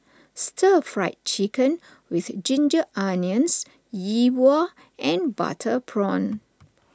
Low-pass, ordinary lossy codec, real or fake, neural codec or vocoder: none; none; real; none